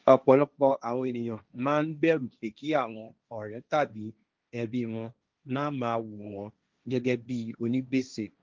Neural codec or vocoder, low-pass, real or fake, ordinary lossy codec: codec, 16 kHz, 1.1 kbps, Voila-Tokenizer; 7.2 kHz; fake; Opus, 24 kbps